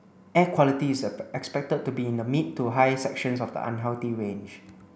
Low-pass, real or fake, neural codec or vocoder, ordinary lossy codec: none; real; none; none